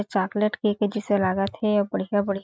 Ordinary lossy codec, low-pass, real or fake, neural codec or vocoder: none; none; real; none